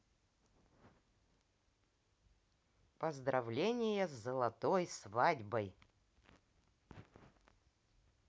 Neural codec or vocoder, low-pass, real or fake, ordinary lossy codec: none; none; real; none